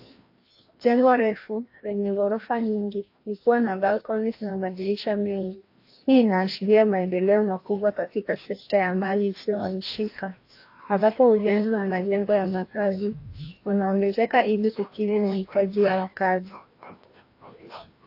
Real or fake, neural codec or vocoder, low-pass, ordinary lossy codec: fake; codec, 16 kHz, 1 kbps, FreqCodec, larger model; 5.4 kHz; AAC, 32 kbps